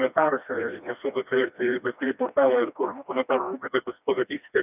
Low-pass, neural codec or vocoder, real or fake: 3.6 kHz; codec, 16 kHz, 1 kbps, FreqCodec, smaller model; fake